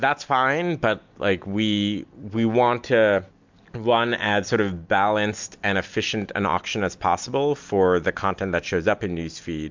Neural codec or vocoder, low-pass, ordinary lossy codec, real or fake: none; 7.2 kHz; MP3, 64 kbps; real